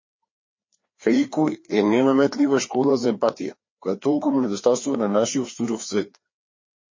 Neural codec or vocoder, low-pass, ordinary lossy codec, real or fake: codec, 16 kHz, 2 kbps, FreqCodec, larger model; 7.2 kHz; MP3, 32 kbps; fake